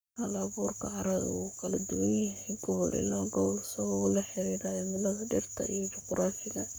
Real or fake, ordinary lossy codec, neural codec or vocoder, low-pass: fake; none; codec, 44.1 kHz, 7.8 kbps, Pupu-Codec; none